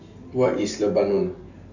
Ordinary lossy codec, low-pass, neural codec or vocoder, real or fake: none; 7.2 kHz; none; real